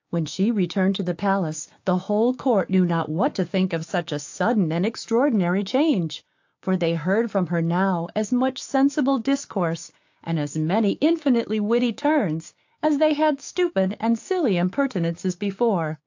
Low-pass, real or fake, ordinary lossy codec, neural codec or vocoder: 7.2 kHz; fake; AAC, 48 kbps; codec, 16 kHz, 6 kbps, DAC